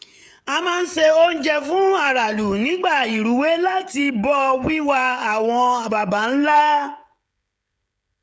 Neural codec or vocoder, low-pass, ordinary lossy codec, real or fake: codec, 16 kHz, 16 kbps, FreqCodec, smaller model; none; none; fake